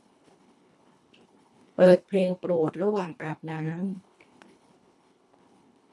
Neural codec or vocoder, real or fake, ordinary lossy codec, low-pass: codec, 24 kHz, 1.5 kbps, HILCodec; fake; none; none